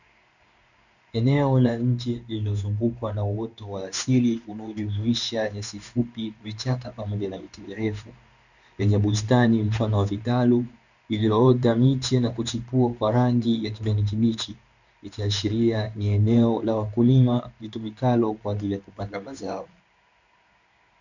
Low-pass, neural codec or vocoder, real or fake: 7.2 kHz; codec, 16 kHz in and 24 kHz out, 1 kbps, XY-Tokenizer; fake